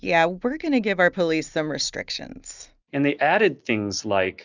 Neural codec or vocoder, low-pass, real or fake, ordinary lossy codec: none; 7.2 kHz; real; Opus, 64 kbps